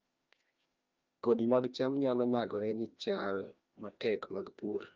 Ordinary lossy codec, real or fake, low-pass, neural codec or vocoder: Opus, 24 kbps; fake; 7.2 kHz; codec, 16 kHz, 1 kbps, FreqCodec, larger model